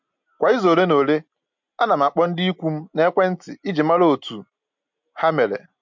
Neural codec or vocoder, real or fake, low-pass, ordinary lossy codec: none; real; 7.2 kHz; MP3, 48 kbps